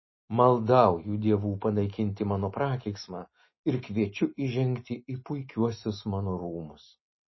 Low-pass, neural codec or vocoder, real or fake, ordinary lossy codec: 7.2 kHz; none; real; MP3, 24 kbps